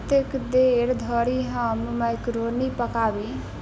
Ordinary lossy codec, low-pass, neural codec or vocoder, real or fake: none; none; none; real